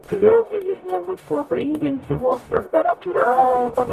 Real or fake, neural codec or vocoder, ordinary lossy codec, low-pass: fake; codec, 44.1 kHz, 0.9 kbps, DAC; Opus, 64 kbps; 14.4 kHz